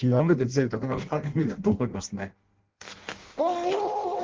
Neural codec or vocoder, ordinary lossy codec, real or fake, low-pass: codec, 16 kHz in and 24 kHz out, 0.6 kbps, FireRedTTS-2 codec; Opus, 16 kbps; fake; 7.2 kHz